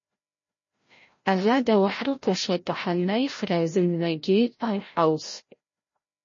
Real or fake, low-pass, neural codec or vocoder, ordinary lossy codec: fake; 7.2 kHz; codec, 16 kHz, 0.5 kbps, FreqCodec, larger model; MP3, 32 kbps